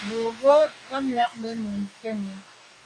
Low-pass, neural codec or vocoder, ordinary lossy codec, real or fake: 9.9 kHz; codec, 44.1 kHz, 2.6 kbps, DAC; MP3, 48 kbps; fake